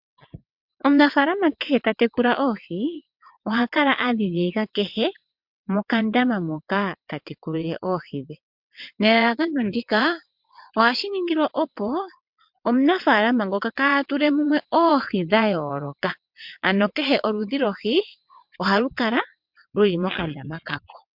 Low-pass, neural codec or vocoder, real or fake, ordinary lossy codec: 5.4 kHz; vocoder, 22.05 kHz, 80 mel bands, WaveNeXt; fake; MP3, 48 kbps